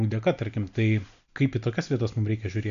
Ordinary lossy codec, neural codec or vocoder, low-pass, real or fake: MP3, 96 kbps; none; 7.2 kHz; real